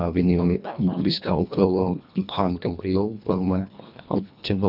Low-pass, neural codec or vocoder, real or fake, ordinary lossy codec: 5.4 kHz; codec, 24 kHz, 1.5 kbps, HILCodec; fake; none